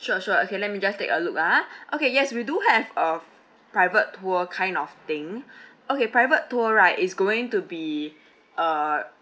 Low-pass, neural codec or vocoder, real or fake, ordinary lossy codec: none; none; real; none